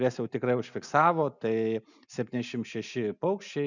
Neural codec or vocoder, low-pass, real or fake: none; 7.2 kHz; real